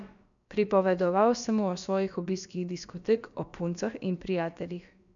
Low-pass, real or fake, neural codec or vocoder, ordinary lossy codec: 7.2 kHz; fake; codec, 16 kHz, about 1 kbps, DyCAST, with the encoder's durations; none